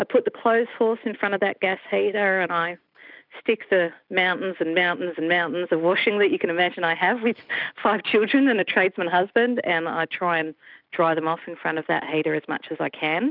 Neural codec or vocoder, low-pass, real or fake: none; 5.4 kHz; real